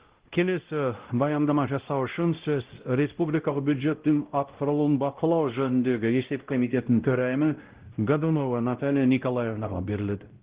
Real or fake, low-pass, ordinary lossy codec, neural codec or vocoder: fake; 3.6 kHz; Opus, 16 kbps; codec, 16 kHz, 0.5 kbps, X-Codec, WavLM features, trained on Multilingual LibriSpeech